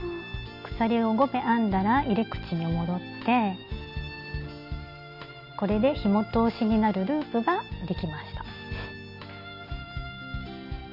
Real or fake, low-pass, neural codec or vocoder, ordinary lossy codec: real; 5.4 kHz; none; none